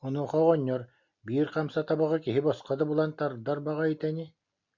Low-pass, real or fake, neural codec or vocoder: 7.2 kHz; real; none